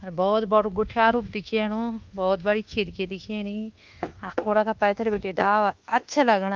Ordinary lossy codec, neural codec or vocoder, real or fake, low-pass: Opus, 32 kbps; codec, 24 kHz, 1.2 kbps, DualCodec; fake; 7.2 kHz